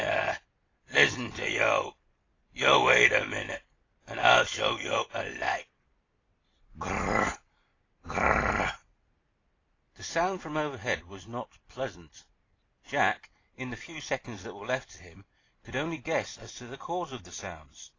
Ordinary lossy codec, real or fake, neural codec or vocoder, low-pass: AAC, 32 kbps; real; none; 7.2 kHz